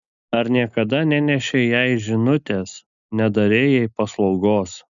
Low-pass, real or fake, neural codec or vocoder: 7.2 kHz; real; none